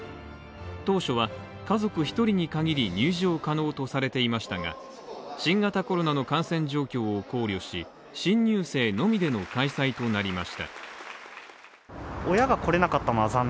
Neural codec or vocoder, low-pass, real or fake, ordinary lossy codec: none; none; real; none